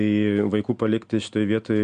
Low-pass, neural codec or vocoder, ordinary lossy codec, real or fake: 9.9 kHz; none; MP3, 64 kbps; real